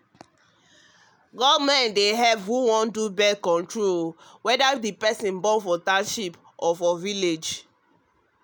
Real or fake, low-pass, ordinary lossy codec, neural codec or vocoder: real; none; none; none